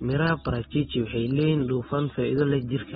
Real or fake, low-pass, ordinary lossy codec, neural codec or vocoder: real; 19.8 kHz; AAC, 16 kbps; none